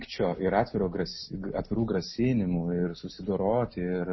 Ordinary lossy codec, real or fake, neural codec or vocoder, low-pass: MP3, 24 kbps; real; none; 7.2 kHz